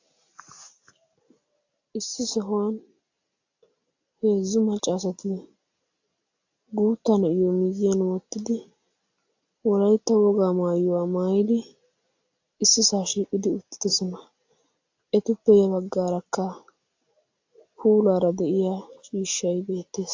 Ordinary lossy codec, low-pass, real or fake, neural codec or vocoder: AAC, 48 kbps; 7.2 kHz; real; none